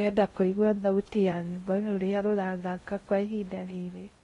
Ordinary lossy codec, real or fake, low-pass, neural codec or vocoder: AAC, 32 kbps; fake; 10.8 kHz; codec, 16 kHz in and 24 kHz out, 0.6 kbps, FocalCodec, streaming, 2048 codes